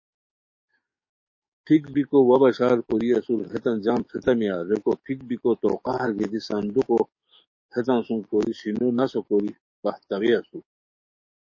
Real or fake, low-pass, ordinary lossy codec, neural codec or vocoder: fake; 7.2 kHz; MP3, 32 kbps; codec, 44.1 kHz, 7.8 kbps, DAC